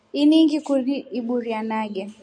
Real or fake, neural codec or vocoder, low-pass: real; none; 9.9 kHz